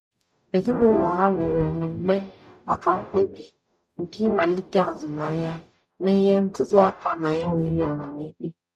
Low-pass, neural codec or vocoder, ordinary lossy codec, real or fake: 14.4 kHz; codec, 44.1 kHz, 0.9 kbps, DAC; none; fake